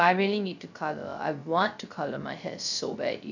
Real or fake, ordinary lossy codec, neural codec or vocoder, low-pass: fake; none; codec, 16 kHz, 0.3 kbps, FocalCodec; 7.2 kHz